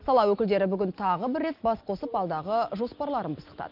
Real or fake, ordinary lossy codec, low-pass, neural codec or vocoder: real; Opus, 32 kbps; 5.4 kHz; none